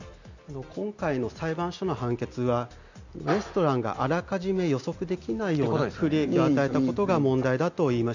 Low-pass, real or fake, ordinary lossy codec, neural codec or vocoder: 7.2 kHz; real; none; none